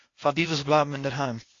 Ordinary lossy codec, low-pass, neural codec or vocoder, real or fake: AAC, 32 kbps; 7.2 kHz; codec, 16 kHz, 0.8 kbps, ZipCodec; fake